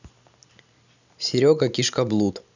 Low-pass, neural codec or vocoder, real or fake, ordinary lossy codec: 7.2 kHz; none; real; none